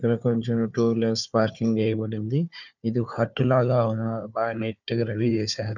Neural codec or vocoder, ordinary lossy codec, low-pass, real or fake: codec, 16 kHz, 4 kbps, FunCodec, trained on Chinese and English, 50 frames a second; none; 7.2 kHz; fake